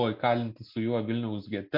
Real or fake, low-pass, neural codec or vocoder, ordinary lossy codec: real; 5.4 kHz; none; MP3, 32 kbps